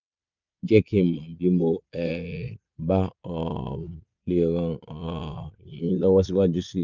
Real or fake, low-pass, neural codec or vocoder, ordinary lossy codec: fake; 7.2 kHz; vocoder, 22.05 kHz, 80 mel bands, Vocos; none